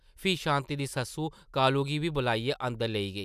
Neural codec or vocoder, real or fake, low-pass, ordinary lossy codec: none; real; 14.4 kHz; none